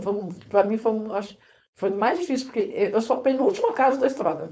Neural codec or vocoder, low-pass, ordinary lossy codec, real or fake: codec, 16 kHz, 4.8 kbps, FACodec; none; none; fake